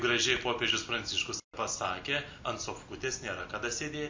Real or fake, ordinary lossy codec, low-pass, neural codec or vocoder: real; MP3, 64 kbps; 7.2 kHz; none